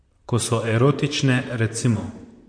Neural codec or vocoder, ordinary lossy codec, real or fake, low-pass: vocoder, 44.1 kHz, 128 mel bands, Pupu-Vocoder; MP3, 48 kbps; fake; 9.9 kHz